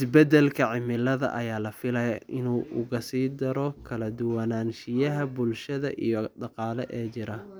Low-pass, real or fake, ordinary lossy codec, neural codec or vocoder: none; real; none; none